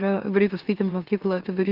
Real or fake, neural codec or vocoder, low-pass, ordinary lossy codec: fake; autoencoder, 44.1 kHz, a latent of 192 numbers a frame, MeloTTS; 5.4 kHz; Opus, 16 kbps